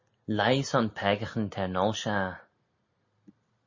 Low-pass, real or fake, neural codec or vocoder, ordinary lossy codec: 7.2 kHz; real; none; MP3, 32 kbps